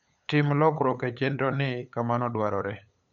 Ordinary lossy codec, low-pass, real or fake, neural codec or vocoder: none; 7.2 kHz; fake; codec, 16 kHz, 8 kbps, FunCodec, trained on LibriTTS, 25 frames a second